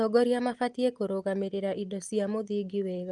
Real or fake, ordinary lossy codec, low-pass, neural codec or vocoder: real; Opus, 24 kbps; 10.8 kHz; none